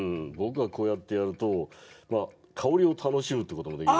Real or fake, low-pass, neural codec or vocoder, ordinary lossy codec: real; none; none; none